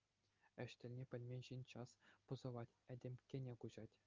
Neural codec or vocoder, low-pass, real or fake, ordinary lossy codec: none; 7.2 kHz; real; Opus, 32 kbps